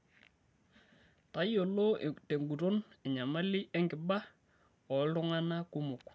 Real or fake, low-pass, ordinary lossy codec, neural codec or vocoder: real; none; none; none